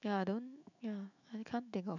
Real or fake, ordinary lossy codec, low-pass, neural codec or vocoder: fake; none; 7.2 kHz; autoencoder, 48 kHz, 128 numbers a frame, DAC-VAE, trained on Japanese speech